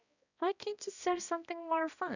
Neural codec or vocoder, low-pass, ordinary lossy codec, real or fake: codec, 16 kHz, 1 kbps, X-Codec, HuBERT features, trained on balanced general audio; 7.2 kHz; none; fake